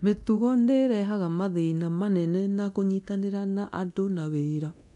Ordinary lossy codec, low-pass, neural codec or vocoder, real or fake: none; 10.8 kHz; codec, 24 kHz, 0.9 kbps, DualCodec; fake